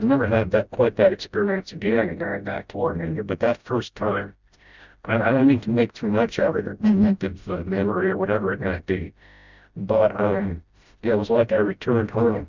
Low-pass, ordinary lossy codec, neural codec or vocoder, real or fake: 7.2 kHz; Opus, 64 kbps; codec, 16 kHz, 0.5 kbps, FreqCodec, smaller model; fake